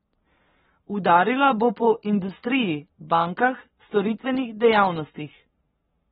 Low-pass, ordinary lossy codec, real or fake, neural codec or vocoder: 19.8 kHz; AAC, 16 kbps; fake; codec, 44.1 kHz, 7.8 kbps, Pupu-Codec